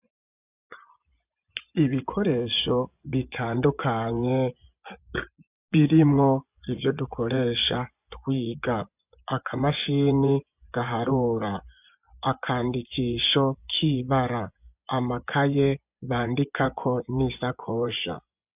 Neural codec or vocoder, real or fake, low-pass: vocoder, 24 kHz, 100 mel bands, Vocos; fake; 3.6 kHz